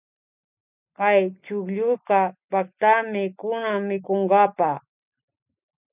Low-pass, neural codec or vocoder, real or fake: 3.6 kHz; none; real